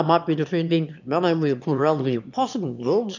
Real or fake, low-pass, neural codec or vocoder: fake; 7.2 kHz; autoencoder, 22.05 kHz, a latent of 192 numbers a frame, VITS, trained on one speaker